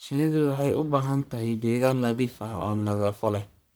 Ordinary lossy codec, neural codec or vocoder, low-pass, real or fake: none; codec, 44.1 kHz, 1.7 kbps, Pupu-Codec; none; fake